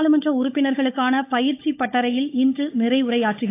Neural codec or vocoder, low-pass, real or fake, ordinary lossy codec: codec, 16 kHz, 16 kbps, FunCodec, trained on LibriTTS, 50 frames a second; 3.6 kHz; fake; AAC, 24 kbps